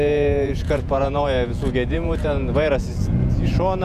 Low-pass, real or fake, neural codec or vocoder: 14.4 kHz; fake; vocoder, 48 kHz, 128 mel bands, Vocos